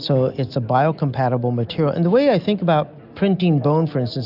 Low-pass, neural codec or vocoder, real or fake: 5.4 kHz; none; real